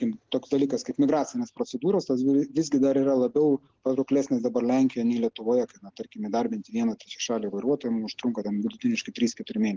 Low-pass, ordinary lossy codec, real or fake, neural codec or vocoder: 7.2 kHz; Opus, 16 kbps; real; none